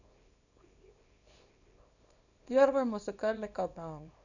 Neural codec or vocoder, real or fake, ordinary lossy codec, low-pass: codec, 24 kHz, 0.9 kbps, WavTokenizer, small release; fake; none; 7.2 kHz